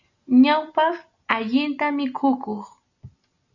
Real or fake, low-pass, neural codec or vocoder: real; 7.2 kHz; none